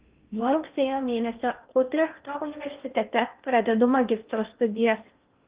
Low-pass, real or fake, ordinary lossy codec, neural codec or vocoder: 3.6 kHz; fake; Opus, 32 kbps; codec, 16 kHz in and 24 kHz out, 0.8 kbps, FocalCodec, streaming, 65536 codes